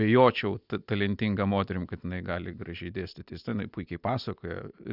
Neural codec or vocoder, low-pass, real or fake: none; 5.4 kHz; real